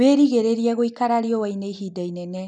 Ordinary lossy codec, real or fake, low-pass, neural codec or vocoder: none; real; 10.8 kHz; none